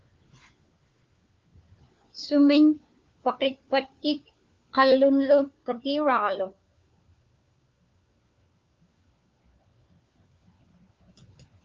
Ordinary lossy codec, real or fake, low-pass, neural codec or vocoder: Opus, 24 kbps; fake; 7.2 kHz; codec, 16 kHz, 4 kbps, FunCodec, trained on LibriTTS, 50 frames a second